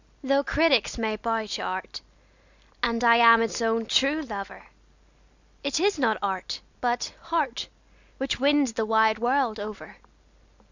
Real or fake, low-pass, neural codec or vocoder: real; 7.2 kHz; none